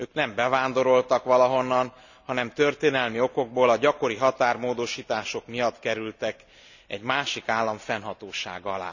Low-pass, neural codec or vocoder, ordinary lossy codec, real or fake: 7.2 kHz; none; none; real